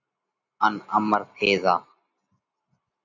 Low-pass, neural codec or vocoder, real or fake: 7.2 kHz; none; real